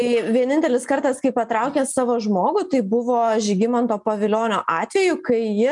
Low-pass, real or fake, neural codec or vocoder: 10.8 kHz; real; none